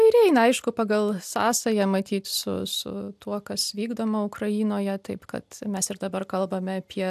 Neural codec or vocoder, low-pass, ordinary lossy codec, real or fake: none; 14.4 kHz; AAC, 96 kbps; real